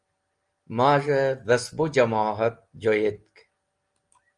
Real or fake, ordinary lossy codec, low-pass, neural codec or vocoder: real; Opus, 32 kbps; 9.9 kHz; none